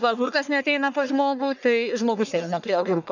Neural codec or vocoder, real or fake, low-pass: codec, 44.1 kHz, 1.7 kbps, Pupu-Codec; fake; 7.2 kHz